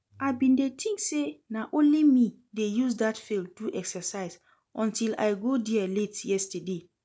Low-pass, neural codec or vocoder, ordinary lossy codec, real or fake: none; none; none; real